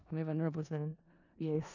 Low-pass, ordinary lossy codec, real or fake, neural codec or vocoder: 7.2 kHz; none; fake; codec, 16 kHz in and 24 kHz out, 0.4 kbps, LongCat-Audio-Codec, four codebook decoder